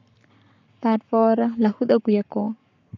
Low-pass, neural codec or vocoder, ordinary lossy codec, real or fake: 7.2 kHz; codec, 44.1 kHz, 7.8 kbps, Pupu-Codec; none; fake